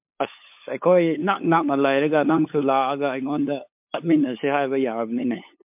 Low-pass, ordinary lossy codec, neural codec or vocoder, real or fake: 3.6 kHz; MP3, 32 kbps; codec, 16 kHz, 8 kbps, FunCodec, trained on LibriTTS, 25 frames a second; fake